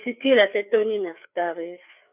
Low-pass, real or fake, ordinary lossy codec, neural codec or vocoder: 3.6 kHz; fake; none; codec, 16 kHz, 4 kbps, FreqCodec, larger model